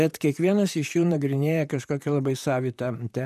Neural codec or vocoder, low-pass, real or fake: vocoder, 44.1 kHz, 128 mel bands, Pupu-Vocoder; 14.4 kHz; fake